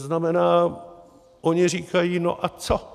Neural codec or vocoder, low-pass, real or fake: none; 14.4 kHz; real